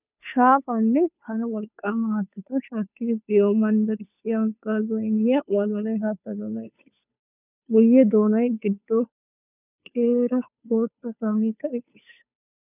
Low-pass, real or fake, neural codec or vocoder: 3.6 kHz; fake; codec, 16 kHz, 2 kbps, FunCodec, trained on Chinese and English, 25 frames a second